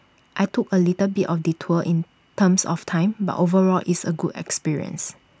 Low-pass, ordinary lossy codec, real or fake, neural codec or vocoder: none; none; real; none